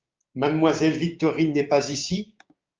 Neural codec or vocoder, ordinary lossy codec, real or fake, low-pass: codec, 16 kHz, 6 kbps, DAC; Opus, 24 kbps; fake; 7.2 kHz